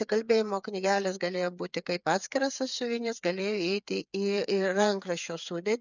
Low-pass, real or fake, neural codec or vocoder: 7.2 kHz; fake; codec, 16 kHz, 8 kbps, FreqCodec, smaller model